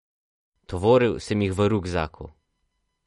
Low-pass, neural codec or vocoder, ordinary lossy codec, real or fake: 19.8 kHz; none; MP3, 48 kbps; real